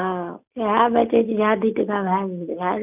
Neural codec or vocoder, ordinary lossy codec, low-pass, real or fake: none; none; 3.6 kHz; real